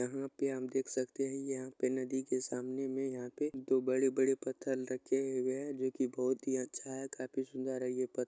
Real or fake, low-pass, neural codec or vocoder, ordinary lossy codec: real; none; none; none